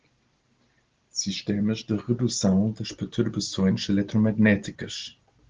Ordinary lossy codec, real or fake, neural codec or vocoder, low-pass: Opus, 16 kbps; real; none; 7.2 kHz